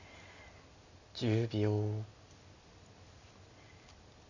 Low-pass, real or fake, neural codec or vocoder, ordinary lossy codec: 7.2 kHz; real; none; none